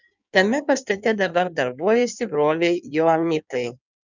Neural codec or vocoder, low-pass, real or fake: codec, 16 kHz in and 24 kHz out, 1.1 kbps, FireRedTTS-2 codec; 7.2 kHz; fake